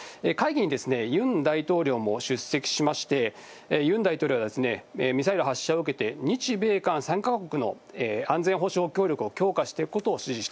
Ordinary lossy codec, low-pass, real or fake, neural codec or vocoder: none; none; real; none